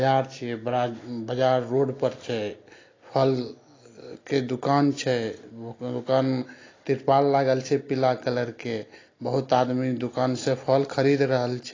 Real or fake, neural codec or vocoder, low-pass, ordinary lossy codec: real; none; 7.2 kHz; AAC, 32 kbps